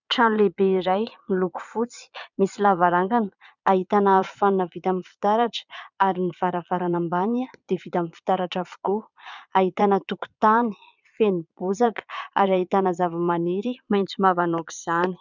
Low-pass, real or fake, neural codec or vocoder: 7.2 kHz; fake; vocoder, 22.05 kHz, 80 mel bands, Vocos